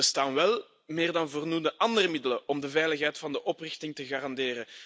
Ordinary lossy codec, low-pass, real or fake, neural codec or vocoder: none; none; real; none